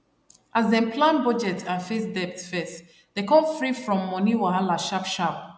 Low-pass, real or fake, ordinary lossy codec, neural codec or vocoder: none; real; none; none